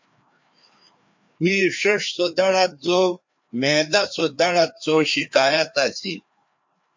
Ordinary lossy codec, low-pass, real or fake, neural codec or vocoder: MP3, 48 kbps; 7.2 kHz; fake; codec, 16 kHz, 2 kbps, FreqCodec, larger model